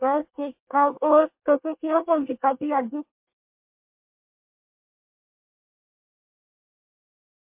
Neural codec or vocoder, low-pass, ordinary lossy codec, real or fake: codec, 24 kHz, 1 kbps, SNAC; 3.6 kHz; MP3, 32 kbps; fake